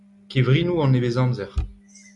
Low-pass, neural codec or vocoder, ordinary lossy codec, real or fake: 10.8 kHz; none; MP3, 96 kbps; real